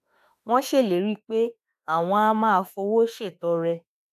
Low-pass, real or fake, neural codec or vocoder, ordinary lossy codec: 14.4 kHz; fake; autoencoder, 48 kHz, 32 numbers a frame, DAC-VAE, trained on Japanese speech; AAC, 96 kbps